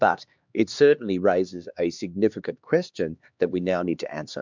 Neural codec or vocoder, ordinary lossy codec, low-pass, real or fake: codec, 16 kHz, 2 kbps, X-Codec, HuBERT features, trained on LibriSpeech; MP3, 64 kbps; 7.2 kHz; fake